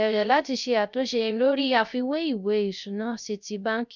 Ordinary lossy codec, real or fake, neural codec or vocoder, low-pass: none; fake; codec, 16 kHz, 0.3 kbps, FocalCodec; 7.2 kHz